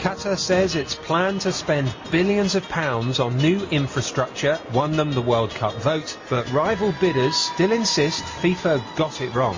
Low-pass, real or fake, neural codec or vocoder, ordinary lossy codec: 7.2 kHz; real; none; MP3, 32 kbps